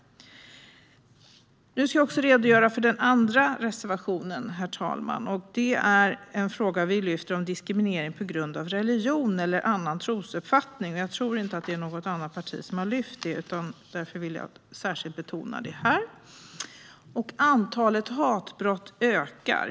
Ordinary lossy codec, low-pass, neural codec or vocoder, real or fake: none; none; none; real